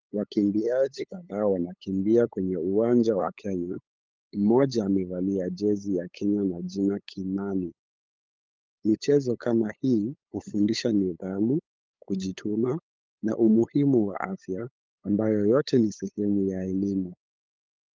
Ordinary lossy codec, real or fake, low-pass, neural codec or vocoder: Opus, 24 kbps; fake; 7.2 kHz; codec, 16 kHz, 8 kbps, FunCodec, trained on LibriTTS, 25 frames a second